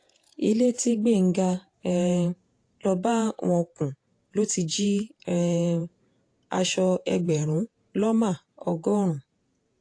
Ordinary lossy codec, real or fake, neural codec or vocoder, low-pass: AAC, 48 kbps; fake; vocoder, 48 kHz, 128 mel bands, Vocos; 9.9 kHz